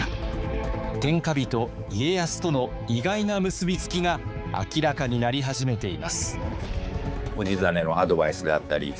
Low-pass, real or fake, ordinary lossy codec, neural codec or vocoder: none; fake; none; codec, 16 kHz, 4 kbps, X-Codec, HuBERT features, trained on balanced general audio